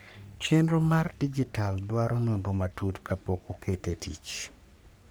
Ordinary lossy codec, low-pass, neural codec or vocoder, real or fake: none; none; codec, 44.1 kHz, 3.4 kbps, Pupu-Codec; fake